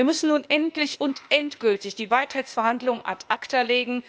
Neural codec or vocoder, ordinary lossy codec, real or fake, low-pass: codec, 16 kHz, 0.8 kbps, ZipCodec; none; fake; none